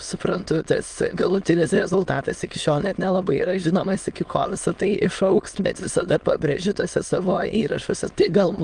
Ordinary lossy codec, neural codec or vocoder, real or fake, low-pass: Opus, 24 kbps; autoencoder, 22.05 kHz, a latent of 192 numbers a frame, VITS, trained on many speakers; fake; 9.9 kHz